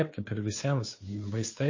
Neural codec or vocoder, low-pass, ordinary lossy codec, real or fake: codec, 16 kHz, 2 kbps, FunCodec, trained on Chinese and English, 25 frames a second; 7.2 kHz; MP3, 32 kbps; fake